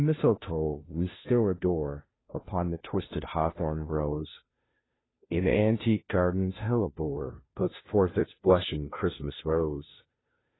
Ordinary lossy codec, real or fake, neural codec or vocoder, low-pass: AAC, 16 kbps; fake; codec, 16 kHz, 0.5 kbps, FunCodec, trained on Chinese and English, 25 frames a second; 7.2 kHz